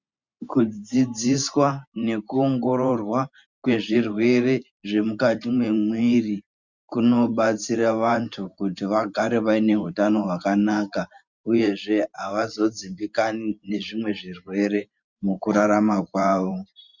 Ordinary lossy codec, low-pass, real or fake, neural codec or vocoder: Opus, 64 kbps; 7.2 kHz; fake; vocoder, 44.1 kHz, 128 mel bands every 512 samples, BigVGAN v2